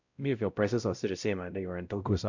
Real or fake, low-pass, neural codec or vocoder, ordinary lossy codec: fake; 7.2 kHz; codec, 16 kHz, 0.5 kbps, X-Codec, WavLM features, trained on Multilingual LibriSpeech; none